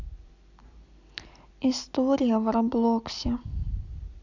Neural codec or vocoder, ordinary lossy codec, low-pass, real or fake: none; none; 7.2 kHz; real